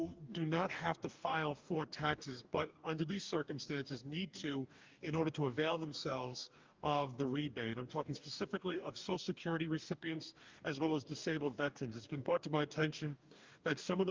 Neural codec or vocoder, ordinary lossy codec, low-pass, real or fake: codec, 44.1 kHz, 2.6 kbps, DAC; Opus, 24 kbps; 7.2 kHz; fake